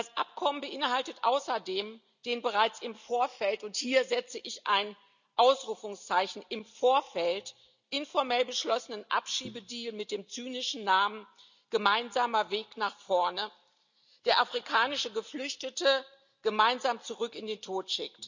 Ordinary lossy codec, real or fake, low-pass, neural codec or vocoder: none; real; 7.2 kHz; none